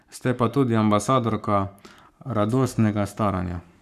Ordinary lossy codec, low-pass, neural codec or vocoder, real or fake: none; 14.4 kHz; codec, 44.1 kHz, 7.8 kbps, Pupu-Codec; fake